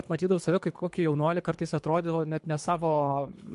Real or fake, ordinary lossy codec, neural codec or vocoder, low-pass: fake; MP3, 64 kbps; codec, 24 kHz, 3 kbps, HILCodec; 10.8 kHz